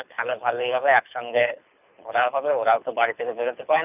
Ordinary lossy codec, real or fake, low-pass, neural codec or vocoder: none; fake; 3.6 kHz; codec, 24 kHz, 6 kbps, HILCodec